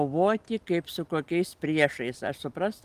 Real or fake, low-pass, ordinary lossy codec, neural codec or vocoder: real; 14.4 kHz; Opus, 32 kbps; none